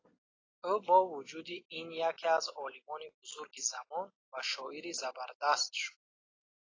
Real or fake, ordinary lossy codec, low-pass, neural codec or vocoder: real; AAC, 32 kbps; 7.2 kHz; none